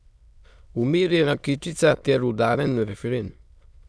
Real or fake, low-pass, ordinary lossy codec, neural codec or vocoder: fake; none; none; autoencoder, 22.05 kHz, a latent of 192 numbers a frame, VITS, trained on many speakers